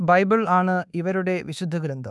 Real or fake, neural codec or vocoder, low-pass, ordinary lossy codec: fake; codec, 24 kHz, 1.2 kbps, DualCodec; none; none